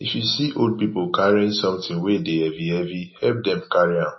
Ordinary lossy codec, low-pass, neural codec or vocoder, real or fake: MP3, 24 kbps; 7.2 kHz; none; real